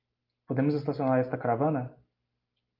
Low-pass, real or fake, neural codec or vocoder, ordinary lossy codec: 5.4 kHz; real; none; Opus, 24 kbps